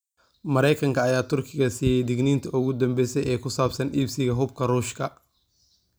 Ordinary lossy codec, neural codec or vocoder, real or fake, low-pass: none; none; real; none